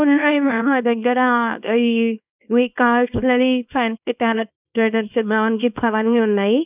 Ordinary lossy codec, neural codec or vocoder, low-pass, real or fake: none; codec, 24 kHz, 0.9 kbps, WavTokenizer, small release; 3.6 kHz; fake